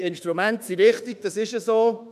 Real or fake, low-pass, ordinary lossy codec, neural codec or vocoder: fake; 14.4 kHz; none; autoencoder, 48 kHz, 32 numbers a frame, DAC-VAE, trained on Japanese speech